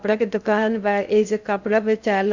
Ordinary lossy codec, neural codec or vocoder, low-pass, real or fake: Opus, 64 kbps; codec, 16 kHz in and 24 kHz out, 0.6 kbps, FocalCodec, streaming, 2048 codes; 7.2 kHz; fake